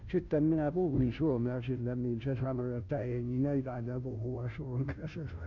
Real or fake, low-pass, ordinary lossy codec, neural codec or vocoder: fake; 7.2 kHz; none; codec, 16 kHz, 0.5 kbps, FunCodec, trained on Chinese and English, 25 frames a second